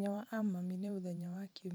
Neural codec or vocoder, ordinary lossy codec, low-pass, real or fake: vocoder, 44.1 kHz, 128 mel bands every 512 samples, BigVGAN v2; none; none; fake